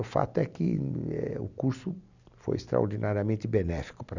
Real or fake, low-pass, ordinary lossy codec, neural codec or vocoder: real; 7.2 kHz; none; none